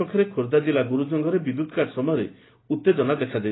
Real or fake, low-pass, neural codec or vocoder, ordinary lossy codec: real; 7.2 kHz; none; AAC, 16 kbps